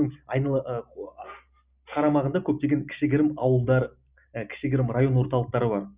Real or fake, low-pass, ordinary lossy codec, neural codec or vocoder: real; 3.6 kHz; Opus, 64 kbps; none